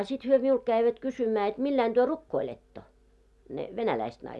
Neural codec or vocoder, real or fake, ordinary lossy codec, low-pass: none; real; none; none